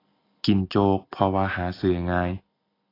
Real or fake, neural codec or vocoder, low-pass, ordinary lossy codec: real; none; 5.4 kHz; AAC, 24 kbps